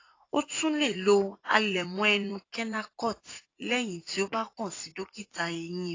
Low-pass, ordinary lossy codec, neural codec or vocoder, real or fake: 7.2 kHz; AAC, 32 kbps; vocoder, 44.1 kHz, 80 mel bands, Vocos; fake